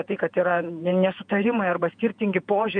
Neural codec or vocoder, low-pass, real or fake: vocoder, 48 kHz, 128 mel bands, Vocos; 9.9 kHz; fake